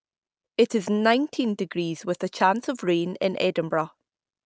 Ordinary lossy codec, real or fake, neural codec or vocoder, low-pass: none; real; none; none